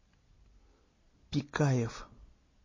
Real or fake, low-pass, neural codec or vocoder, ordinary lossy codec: real; 7.2 kHz; none; MP3, 32 kbps